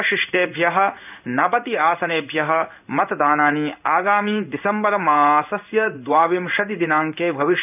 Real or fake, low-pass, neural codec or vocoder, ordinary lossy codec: fake; 3.6 kHz; codec, 16 kHz in and 24 kHz out, 1 kbps, XY-Tokenizer; none